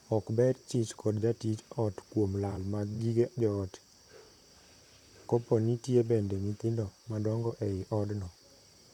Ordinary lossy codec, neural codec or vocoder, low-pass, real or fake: none; vocoder, 44.1 kHz, 128 mel bands, Pupu-Vocoder; 19.8 kHz; fake